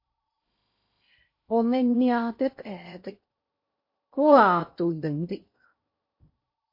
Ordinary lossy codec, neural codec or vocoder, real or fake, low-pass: MP3, 32 kbps; codec, 16 kHz in and 24 kHz out, 0.6 kbps, FocalCodec, streaming, 2048 codes; fake; 5.4 kHz